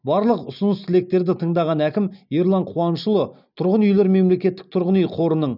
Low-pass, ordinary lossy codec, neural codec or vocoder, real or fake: 5.4 kHz; none; none; real